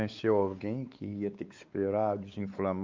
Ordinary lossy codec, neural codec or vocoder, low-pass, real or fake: Opus, 24 kbps; codec, 16 kHz, 4 kbps, X-Codec, WavLM features, trained on Multilingual LibriSpeech; 7.2 kHz; fake